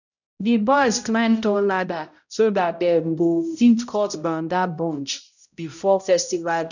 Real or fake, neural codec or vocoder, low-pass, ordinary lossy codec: fake; codec, 16 kHz, 0.5 kbps, X-Codec, HuBERT features, trained on balanced general audio; 7.2 kHz; none